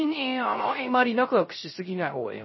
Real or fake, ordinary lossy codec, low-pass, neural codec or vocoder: fake; MP3, 24 kbps; 7.2 kHz; codec, 16 kHz, 0.3 kbps, FocalCodec